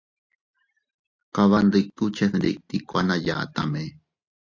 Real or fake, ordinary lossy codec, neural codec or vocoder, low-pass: real; AAC, 48 kbps; none; 7.2 kHz